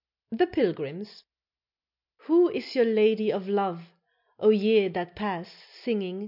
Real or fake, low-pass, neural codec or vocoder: real; 5.4 kHz; none